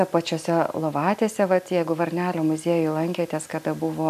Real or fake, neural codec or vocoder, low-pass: real; none; 14.4 kHz